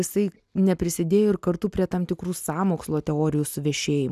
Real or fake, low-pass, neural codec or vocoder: real; 14.4 kHz; none